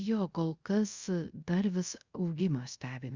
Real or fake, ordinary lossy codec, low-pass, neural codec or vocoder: fake; Opus, 64 kbps; 7.2 kHz; codec, 24 kHz, 0.5 kbps, DualCodec